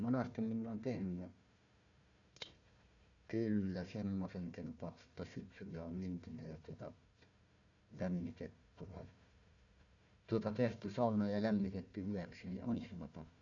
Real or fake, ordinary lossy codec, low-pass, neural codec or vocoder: fake; none; 7.2 kHz; codec, 16 kHz, 1 kbps, FunCodec, trained on Chinese and English, 50 frames a second